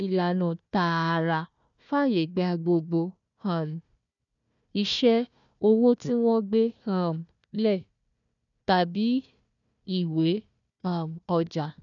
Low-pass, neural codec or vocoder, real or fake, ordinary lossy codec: 7.2 kHz; codec, 16 kHz, 1 kbps, FunCodec, trained on Chinese and English, 50 frames a second; fake; none